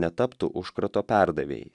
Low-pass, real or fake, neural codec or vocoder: 10.8 kHz; real; none